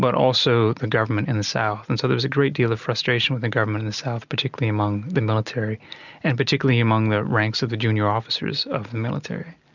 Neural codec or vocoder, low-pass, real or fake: none; 7.2 kHz; real